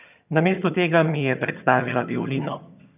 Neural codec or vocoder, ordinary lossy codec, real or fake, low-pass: vocoder, 22.05 kHz, 80 mel bands, HiFi-GAN; none; fake; 3.6 kHz